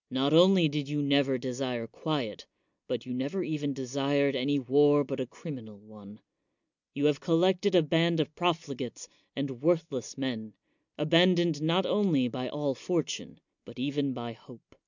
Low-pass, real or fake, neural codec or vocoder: 7.2 kHz; real; none